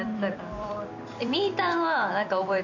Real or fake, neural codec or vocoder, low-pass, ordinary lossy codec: fake; vocoder, 44.1 kHz, 128 mel bands every 256 samples, BigVGAN v2; 7.2 kHz; none